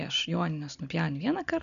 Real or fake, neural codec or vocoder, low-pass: real; none; 7.2 kHz